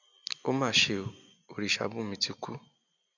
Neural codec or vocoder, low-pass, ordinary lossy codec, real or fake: none; 7.2 kHz; none; real